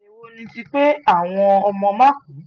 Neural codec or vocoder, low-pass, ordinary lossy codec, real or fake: none; 7.2 kHz; Opus, 24 kbps; real